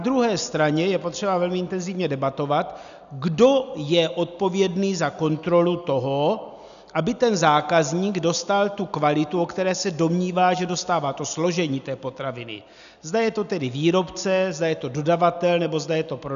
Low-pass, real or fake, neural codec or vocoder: 7.2 kHz; real; none